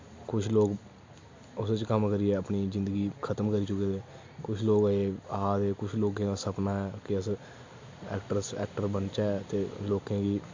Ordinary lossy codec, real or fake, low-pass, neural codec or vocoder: MP3, 48 kbps; real; 7.2 kHz; none